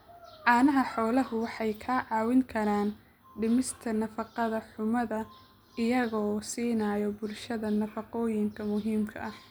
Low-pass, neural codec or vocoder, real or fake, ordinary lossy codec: none; none; real; none